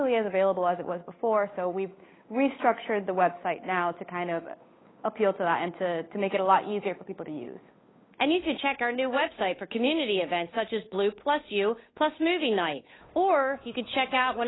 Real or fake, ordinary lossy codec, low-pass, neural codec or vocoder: fake; AAC, 16 kbps; 7.2 kHz; codec, 16 kHz, 8 kbps, FunCodec, trained on Chinese and English, 25 frames a second